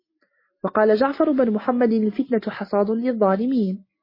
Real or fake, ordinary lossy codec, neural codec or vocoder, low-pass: real; MP3, 24 kbps; none; 5.4 kHz